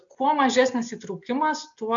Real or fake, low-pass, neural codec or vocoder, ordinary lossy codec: real; 7.2 kHz; none; AAC, 64 kbps